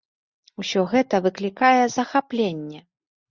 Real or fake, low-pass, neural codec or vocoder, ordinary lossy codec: real; 7.2 kHz; none; Opus, 64 kbps